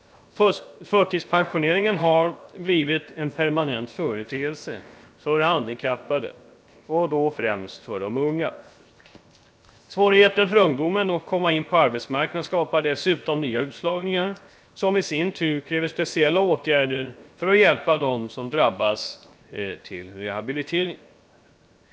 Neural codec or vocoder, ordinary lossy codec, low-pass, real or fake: codec, 16 kHz, 0.7 kbps, FocalCodec; none; none; fake